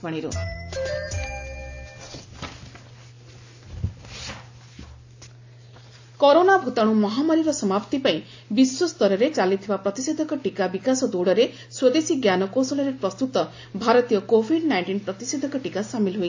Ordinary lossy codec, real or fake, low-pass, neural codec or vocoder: AAC, 48 kbps; real; 7.2 kHz; none